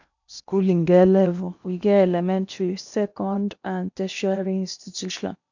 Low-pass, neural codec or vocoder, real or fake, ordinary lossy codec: 7.2 kHz; codec, 16 kHz in and 24 kHz out, 0.8 kbps, FocalCodec, streaming, 65536 codes; fake; none